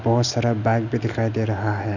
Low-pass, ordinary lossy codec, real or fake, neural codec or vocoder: 7.2 kHz; none; real; none